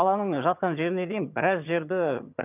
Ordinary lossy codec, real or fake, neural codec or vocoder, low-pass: none; fake; vocoder, 22.05 kHz, 80 mel bands, HiFi-GAN; 3.6 kHz